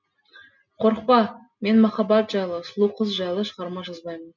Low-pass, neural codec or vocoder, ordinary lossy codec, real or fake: 7.2 kHz; none; none; real